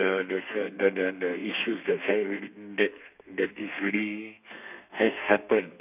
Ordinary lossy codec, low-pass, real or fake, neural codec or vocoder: none; 3.6 kHz; fake; codec, 32 kHz, 1.9 kbps, SNAC